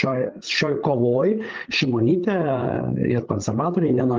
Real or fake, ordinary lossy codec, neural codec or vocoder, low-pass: fake; Opus, 32 kbps; codec, 16 kHz, 8 kbps, FreqCodec, larger model; 7.2 kHz